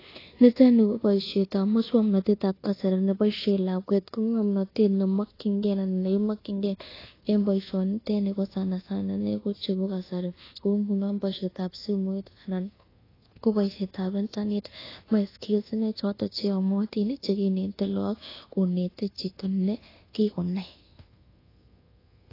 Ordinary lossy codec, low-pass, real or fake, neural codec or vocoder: AAC, 24 kbps; 5.4 kHz; fake; codec, 24 kHz, 1.2 kbps, DualCodec